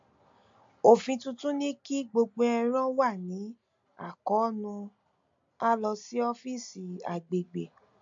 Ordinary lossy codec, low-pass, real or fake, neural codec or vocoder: MP3, 48 kbps; 7.2 kHz; real; none